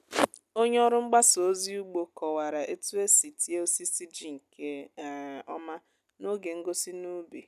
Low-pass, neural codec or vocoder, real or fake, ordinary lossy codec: 14.4 kHz; none; real; none